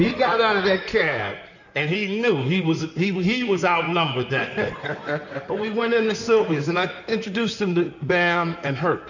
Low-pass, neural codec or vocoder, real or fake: 7.2 kHz; codec, 16 kHz in and 24 kHz out, 2.2 kbps, FireRedTTS-2 codec; fake